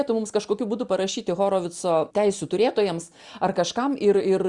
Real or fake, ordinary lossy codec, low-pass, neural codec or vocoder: real; Opus, 64 kbps; 10.8 kHz; none